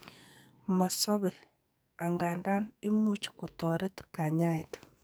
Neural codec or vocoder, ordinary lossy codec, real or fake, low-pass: codec, 44.1 kHz, 2.6 kbps, SNAC; none; fake; none